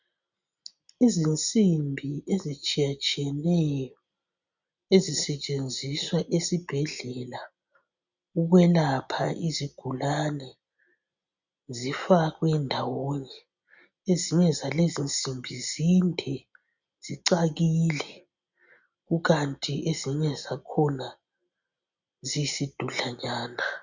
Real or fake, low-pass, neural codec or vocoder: real; 7.2 kHz; none